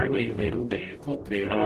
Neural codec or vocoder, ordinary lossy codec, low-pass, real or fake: codec, 44.1 kHz, 0.9 kbps, DAC; Opus, 16 kbps; 14.4 kHz; fake